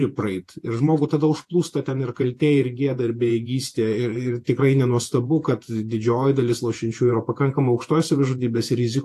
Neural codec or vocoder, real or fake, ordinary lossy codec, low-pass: vocoder, 48 kHz, 128 mel bands, Vocos; fake; AAC, 64 kbps; 14.4 kHz